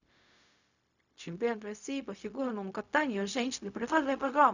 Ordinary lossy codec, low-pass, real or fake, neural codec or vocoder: none; 7.2 kHz; fake; codec, 16 kHz, 0.4 kbps, LongCat-Audio-Codec